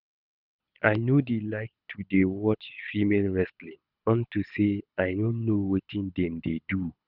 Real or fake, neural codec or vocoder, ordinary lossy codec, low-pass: fake; codec, 24 kHz, 6 kbps, HILCodec; none; 5.4 kHz